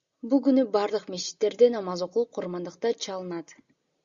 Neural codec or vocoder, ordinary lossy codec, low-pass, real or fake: none; Opus, 64 kbps; 7.2 kHz; real